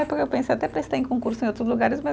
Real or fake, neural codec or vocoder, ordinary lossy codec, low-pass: real; none; none; none